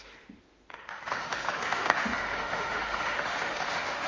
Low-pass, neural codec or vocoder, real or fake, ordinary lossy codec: 7.2 kHz; autoencoder, 48 kHz, 32 numbers a frame, DAC-VAE, trained on Japanese speech; fake; Opus, 32 kbps